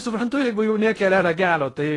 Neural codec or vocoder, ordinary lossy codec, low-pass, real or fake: codec, 16 kHz in and 24 kHz out, 0.6 kbps, FocalCodec, streaming, 2048 codes; AAC, 32 kbps; 10.8 kHz; fake